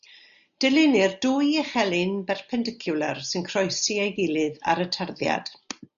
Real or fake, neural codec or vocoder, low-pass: real; none; 7.2 kHz